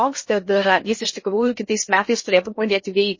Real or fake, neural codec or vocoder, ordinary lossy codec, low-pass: fake; codec, 16 kHz in and 24 kHz out, 0.6 kbps, FocalCodec, streaming, 2048 codes; MP3, 32 kbps; 7.2 kHz